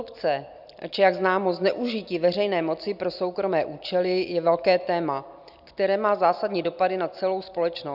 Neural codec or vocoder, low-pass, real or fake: none; 5.4 kHz; real